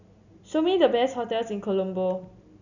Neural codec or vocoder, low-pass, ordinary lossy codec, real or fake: none; 7.2 kHz; none; real